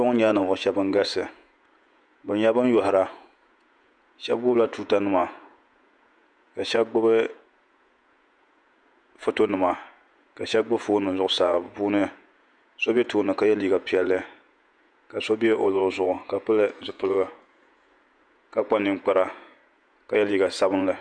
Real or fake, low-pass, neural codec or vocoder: fake; 9.9 kHz; vocoder, 48 kHz, 128 mel bands, Vocos